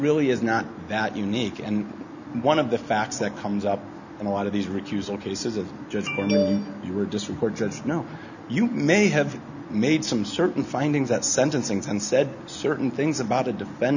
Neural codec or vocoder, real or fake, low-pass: none; real; 7.2 kHz